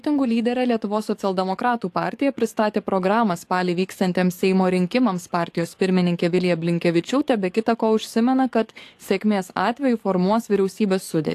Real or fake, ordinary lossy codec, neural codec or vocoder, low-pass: fake; AAC, 64 kbps; codec, 44.1 kHz, 7.8 kbps, DAC; 14.4 kHz